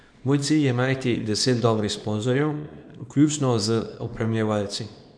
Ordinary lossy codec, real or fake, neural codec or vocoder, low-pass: none; fake; codec, 24 kHz, 0.9 kbps, WavTokenizer, small release; 9.9 kHz